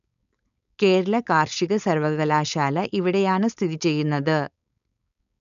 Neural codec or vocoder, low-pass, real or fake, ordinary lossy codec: codec, 16 kHz, 4.8 kbps, FACodec; 7.2 kHz; fake; none